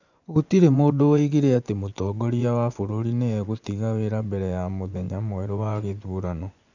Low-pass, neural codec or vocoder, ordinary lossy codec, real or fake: 7.2 kHz; vocoder, 24 kHz, 100 mel bands, Vocos; none; fake